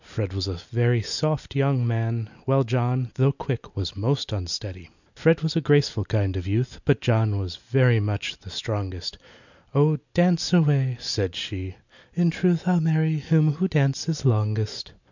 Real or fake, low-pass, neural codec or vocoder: real; 7.2 kHz; none